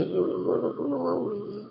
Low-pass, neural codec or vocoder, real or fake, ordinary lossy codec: 5.4 kHz; autoencoder, 22.05 kHz, a latent of 192 numbers a frame, VITS, trained on one speaker; fake; MP3, 24 kbps